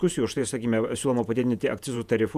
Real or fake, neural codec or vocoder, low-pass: fake; vocoder, 48 kHz, 128 mel bands, Vocos; 14.4 kHz